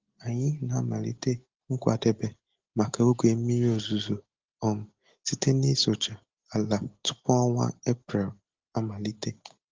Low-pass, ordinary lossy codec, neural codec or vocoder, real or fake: 7.2 kHz; Opus, 16 kbps; none; real